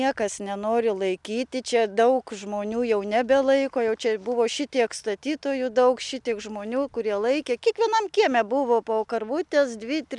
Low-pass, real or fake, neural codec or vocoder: 10.8 kHz; real; none